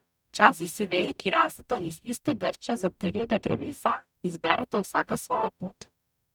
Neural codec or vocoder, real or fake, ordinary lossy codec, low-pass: codec, 44.1 kHz, 0.9 kbps, DAC; fake; none; 19.8 kHz